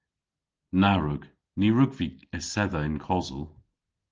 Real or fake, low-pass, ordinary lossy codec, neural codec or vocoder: real; 7.2 kHz; Opus, 16 kbps; none